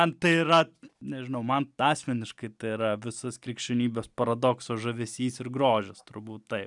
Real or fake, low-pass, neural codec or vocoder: real; 10.8 kHz; none